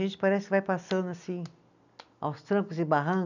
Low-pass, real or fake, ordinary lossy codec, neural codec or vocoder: 7.2 kHz; real; none; none